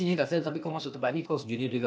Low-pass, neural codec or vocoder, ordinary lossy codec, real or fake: none; codec, 16 kHz, 0.8 kbps, ZipCodec; none; fake